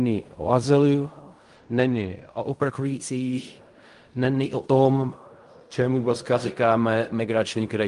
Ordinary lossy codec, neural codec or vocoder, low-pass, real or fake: Opus, 32 kbps; codec, 16 kHz in and 24 kHz out, 0.4 kbps, LongCat-Audio-Codec, fine tuned four codebook decoder; 10.8 kHz; fake